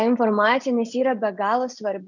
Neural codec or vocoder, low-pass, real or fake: none; 7.2 kHz; real